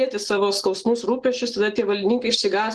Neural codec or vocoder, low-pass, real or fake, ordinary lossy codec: none; 10.8 kHz; real; Opus, 16 kbps